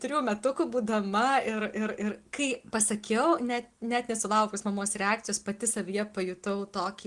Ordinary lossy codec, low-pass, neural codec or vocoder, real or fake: Opus, 24 kbps; 10.8 kHz; none; real